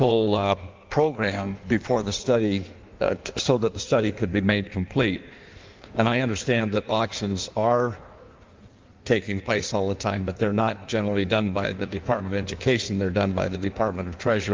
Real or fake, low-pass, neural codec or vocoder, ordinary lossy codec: fake; 7.2 kHz; codec, 16 kHz in and 24 kHz out, 1.1 kbps, FireRedTTS-2 codec; Opus, 32 kbps